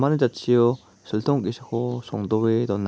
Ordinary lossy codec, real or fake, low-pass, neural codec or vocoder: none; real; none; none